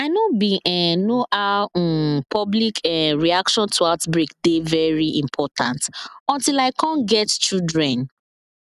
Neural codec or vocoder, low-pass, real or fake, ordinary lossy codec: none; 14.4 kHz; real; none